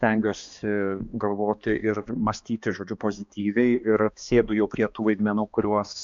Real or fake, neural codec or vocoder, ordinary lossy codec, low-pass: fake; codec, 16 kHz, 2 kbps, X-Codec, HuBERT features, trained on balanced general audio; MP3, 64 kbps; 7.2 kHz